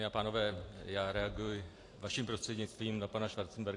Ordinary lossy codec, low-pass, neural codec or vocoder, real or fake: AAC, 48 kbps; 10.8 kHz; vocoder, 44.1 kHz, 128 mel bands every 512 samples, BigVGAN v2; fake